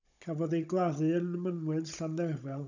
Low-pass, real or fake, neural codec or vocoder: 7.2 kHz; fake; codec, 16 kHz, 16 kbps, FunCodec, trained on Chinese and English, 50 frames a second